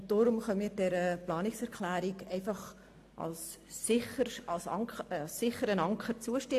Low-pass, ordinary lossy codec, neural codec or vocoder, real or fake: 14.4 kHz; AAC, 64 kbps; none; real